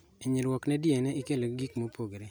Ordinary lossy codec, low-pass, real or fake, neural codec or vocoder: none; none; real; none